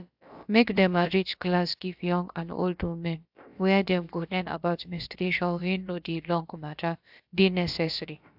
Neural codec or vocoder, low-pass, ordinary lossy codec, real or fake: codec, 16 kHz, about 1 kbps, DyCAST, with the encoder's durations; 5.4 kHz; AAC, 48 kbps; fake